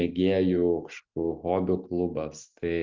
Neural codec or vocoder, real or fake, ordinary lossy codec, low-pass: none; real; Opus, 24 kbps; 7.2 kHz